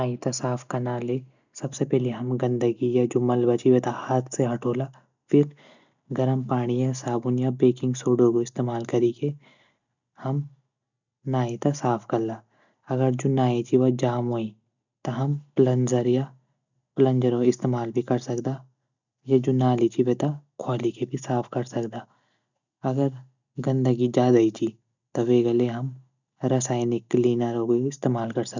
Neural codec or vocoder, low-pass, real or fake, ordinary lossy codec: none; 7.2 kHz; real; none